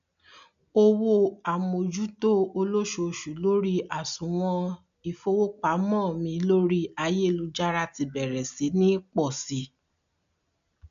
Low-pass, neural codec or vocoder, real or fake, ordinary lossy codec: 7.2 kHz; none; real; none